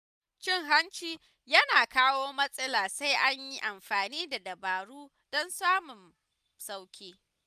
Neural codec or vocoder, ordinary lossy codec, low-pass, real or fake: none; none; 14.4 kHz; real